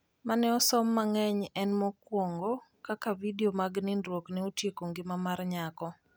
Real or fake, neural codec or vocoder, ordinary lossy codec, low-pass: real; none; none; none